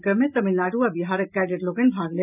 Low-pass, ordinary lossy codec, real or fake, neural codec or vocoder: 3.6 kHz; none; real; none